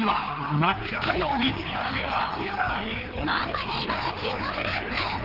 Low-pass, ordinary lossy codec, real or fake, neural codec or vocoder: 5.4 kHz; Opus, 16 kbps; fake; codec, 16 kHz, 2 kbps, FreqCodec, larger model